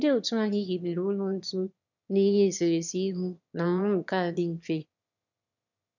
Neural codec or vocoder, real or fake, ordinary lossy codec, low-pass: autoencoder, 22.05 kHz, a latent of 192 numbers a frame, VITS, trained on one speaker; fake; none; 7.2 kHz